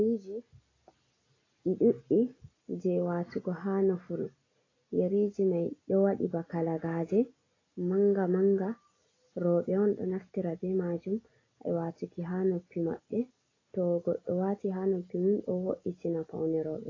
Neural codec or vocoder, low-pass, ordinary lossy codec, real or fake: none; 7.2 kHz; AAC, 32 kbps; real